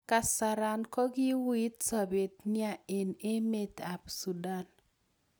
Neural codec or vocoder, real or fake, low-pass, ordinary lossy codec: none; real; none; none